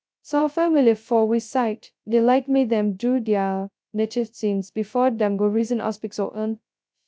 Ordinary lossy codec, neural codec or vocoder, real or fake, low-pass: none; codec, 16 kHz, 0.2 kbps, FocalCodec; fake; none